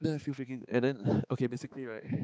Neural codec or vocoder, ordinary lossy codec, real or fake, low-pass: codec, 16 kHz, 4 kbps, X-Codec, HuBERT features, trained on balanced general audio; none; fake; none